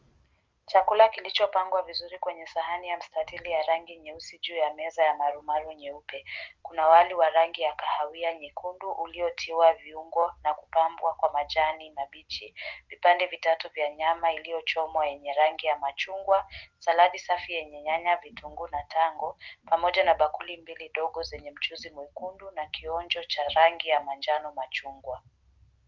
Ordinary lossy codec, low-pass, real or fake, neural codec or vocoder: Opus, 24 kbps; 7.2 kHz; real; none